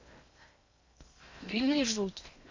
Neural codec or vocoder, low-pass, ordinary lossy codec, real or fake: codec, 16 kHz in and 24 kHz out, 0.6 kbps, FocalCodec, streaming, 2048 codes; 7.2 kHz; MP3, 48 kbps; fake